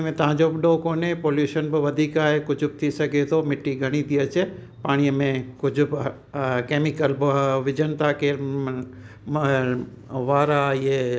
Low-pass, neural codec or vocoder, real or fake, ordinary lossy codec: none; none; real; none